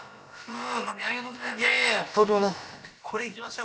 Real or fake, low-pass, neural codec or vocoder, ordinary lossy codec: fake; none; codec, 16 kHz, about 1 kbps, DyCAST, with the encoder's durations; none